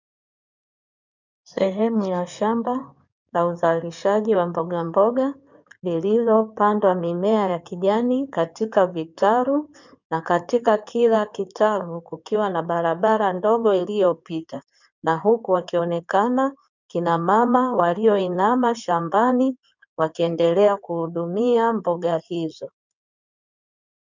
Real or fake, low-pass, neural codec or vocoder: fake; 7.2 kHz; codec, 16 kHz in and 24 kHz out, 2.2 kbps, FireRedTTS-2 codec